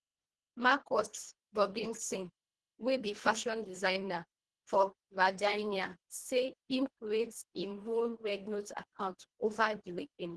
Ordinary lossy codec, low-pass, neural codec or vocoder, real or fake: Opus, 16 kbps; 10.8 kHz; codec, 24 kHz, 1.5 kbps, HILCodec; fake